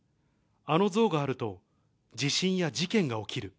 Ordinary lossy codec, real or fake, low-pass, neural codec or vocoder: none; real; none; none